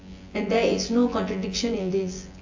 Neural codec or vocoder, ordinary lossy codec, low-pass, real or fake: vocoder, 24 kHz, 100 mel bands, Vocos; AAC, 48 kbps; 7.2 kHz; fake